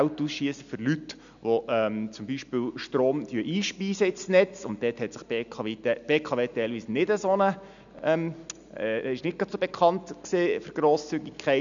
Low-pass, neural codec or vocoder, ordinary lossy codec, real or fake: 7.2 kHz; none; none; real